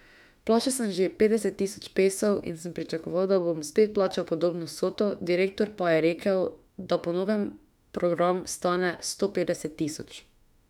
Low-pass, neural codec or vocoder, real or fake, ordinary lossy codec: 19.8 kHz; autoencoder, 48 kHz, 32 numbers a frame, DAC-VAE, trained on Japanese speech; fake; none